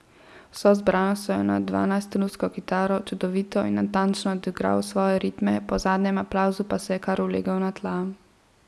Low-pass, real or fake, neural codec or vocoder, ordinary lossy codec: none; real; none; none